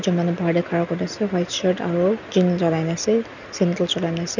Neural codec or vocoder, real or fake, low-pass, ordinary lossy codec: none; real; 7.2 kHz; none